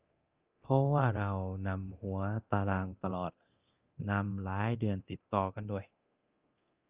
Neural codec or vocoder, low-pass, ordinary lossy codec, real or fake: codec, 24 kHz, 0.9 kbps, DualCodec; 3.6 kHz; Opus, 24 kbps; fake